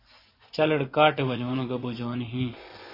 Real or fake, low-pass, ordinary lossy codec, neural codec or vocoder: fake; 5.4 kHz; AAC, 24 kbps; vocoder, 24 kHz, 100 mel bands, Vocos